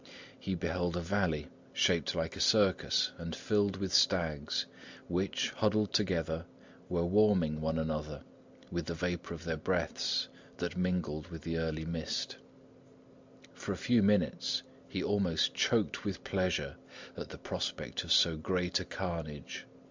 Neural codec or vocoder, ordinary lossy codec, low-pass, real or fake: none; MP3, 64 kbps; 7.2 kHz; real